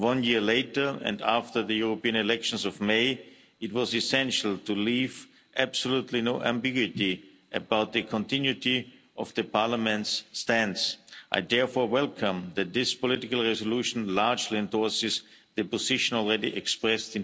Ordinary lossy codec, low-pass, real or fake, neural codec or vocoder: none; none; real; none